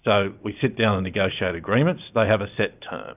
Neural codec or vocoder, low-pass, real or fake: none; 3.6 kHz; real